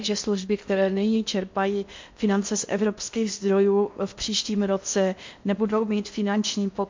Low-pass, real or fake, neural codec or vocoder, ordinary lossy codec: 7.2 kHz; fake; codec, 16 kHz in and 24 kHz out, 0.8 kbps, FocalCodec, streaming, 65536 codes; MP3, 48 kbps